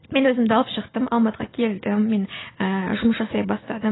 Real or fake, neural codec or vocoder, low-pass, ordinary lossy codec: real; none; 7.2 kHz; AAC, 16 kbps